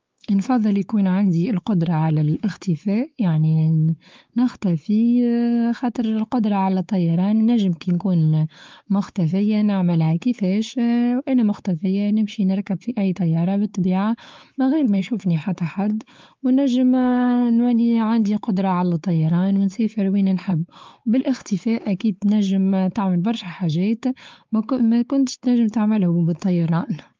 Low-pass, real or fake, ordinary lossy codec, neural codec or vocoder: 7.2 kHz; fake; Opus, 32 kbps; codec, 16 kHz, 4 kbps, X-Codec, WavLM features, trained on Multilingual LibriSpeech